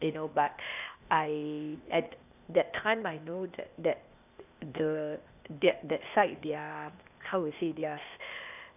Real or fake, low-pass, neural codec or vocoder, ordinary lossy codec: fake; 3.6 kHz; codec, 16 kHz, 0.8 kbps, ZipCodec; none